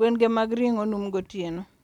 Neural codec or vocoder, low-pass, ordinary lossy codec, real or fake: none; 19.8 kHz; none; real